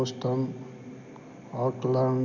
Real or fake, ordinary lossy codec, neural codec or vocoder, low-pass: real; none; none; 7.2 kHz